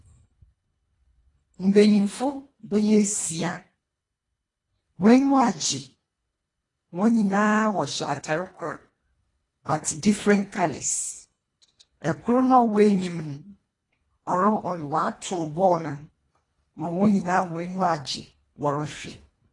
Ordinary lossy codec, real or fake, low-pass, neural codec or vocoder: AAC, 32 kbps; fake; 10.8 kHz; codec, 24 kHz, 1.5 kbps, HILCodec